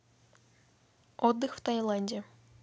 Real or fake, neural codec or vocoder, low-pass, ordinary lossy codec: real; none; none; none